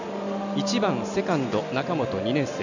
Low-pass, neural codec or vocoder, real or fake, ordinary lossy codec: 7.2 kHz; none; real; none